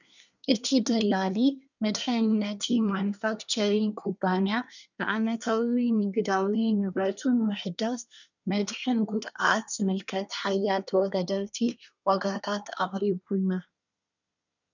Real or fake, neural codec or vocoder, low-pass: fake; codec, 24 kHz, 1 kbps, SNAC; 7.2 kHz